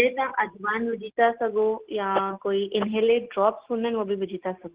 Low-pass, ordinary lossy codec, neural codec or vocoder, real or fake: 3.6 kHz; Opus, 16 kbps; none; real